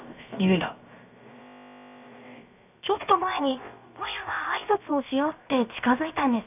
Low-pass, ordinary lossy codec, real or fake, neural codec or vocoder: 3.6 kHz; none; fake; codec, 16 kHz, about 1 kbps, DyCAST, with the encoder's durations